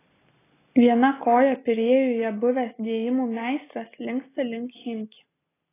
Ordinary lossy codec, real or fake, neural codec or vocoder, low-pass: AAC, 16 kbps; real; none; 3.6 kHz